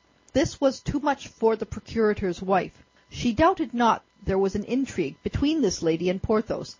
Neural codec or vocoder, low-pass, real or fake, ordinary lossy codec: none; 7.2 kHz; real; MP3, 32 kbps